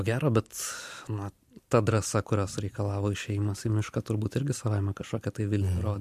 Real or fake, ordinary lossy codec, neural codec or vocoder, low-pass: real; MP3, 64 kbps; none; 14.4 kHz